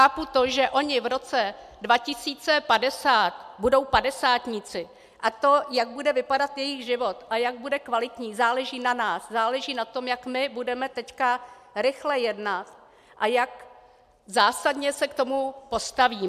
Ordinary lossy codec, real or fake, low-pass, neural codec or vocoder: MP3, 96 kbps; real; 14.4 kHz; none